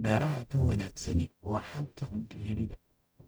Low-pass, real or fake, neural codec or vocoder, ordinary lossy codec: none; fake; codec, 44.1 kHz, 0.9 kbps, DAC; none